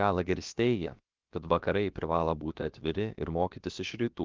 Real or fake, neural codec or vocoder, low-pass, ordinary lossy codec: fake; codec, 16 kHz, about 1 kbps, DyCAST, with the encoder's durations; 7.2 kHz; Opus, 32 kbps